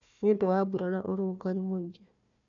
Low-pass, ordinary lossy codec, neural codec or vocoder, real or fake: 7.2 kHz; none; codec, 16 kHz, 1 kbps, FunCodec, trained on Chinese and English, 50 frames a second; fake